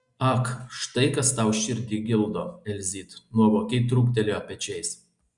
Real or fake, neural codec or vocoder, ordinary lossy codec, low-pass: real; none; Opus, 64 kbps; 10.8 kHz